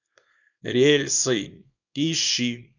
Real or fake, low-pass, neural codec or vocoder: fake; 7.2 kHz; codec, 24 kHz, 0.9 kbps, WavTokenizer, small release